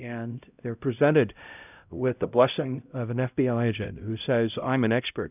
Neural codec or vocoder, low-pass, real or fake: codec, 16 kHz, 0.5 kbps, X-Codec, HuBERT features, trained on LibriSpeech; 3.6 kHz; fake